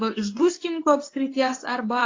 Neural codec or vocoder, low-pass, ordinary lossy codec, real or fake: codec, 24 kHz, 0.9 kbps, WavTokenizer, medium speech release version 2; 7.2 kHz; AAC, 32 kbps; fake